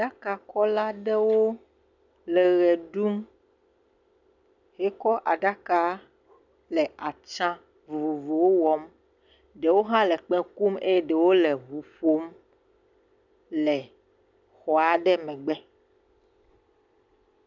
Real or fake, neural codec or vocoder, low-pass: real; none; 7.2 kHz